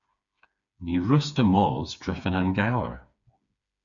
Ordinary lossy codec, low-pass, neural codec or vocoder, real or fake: MP3, 64 kbps; 7.2 kHz; codec, 16 kHz, 4 kbps, FreqCodec, smaller model; fake